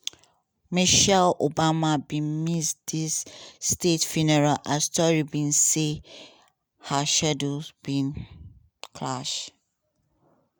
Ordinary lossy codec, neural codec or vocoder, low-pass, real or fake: none; none; none; real